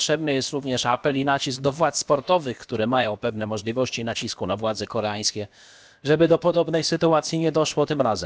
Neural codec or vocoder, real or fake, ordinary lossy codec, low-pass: codec, 16 kHz, about 1 kbps, DyCAST, with the encoder's durations; fake; none; none